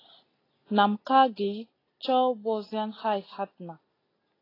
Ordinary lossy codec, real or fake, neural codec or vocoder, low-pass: AAC, 24 kbps; real; none; 5.4 kHz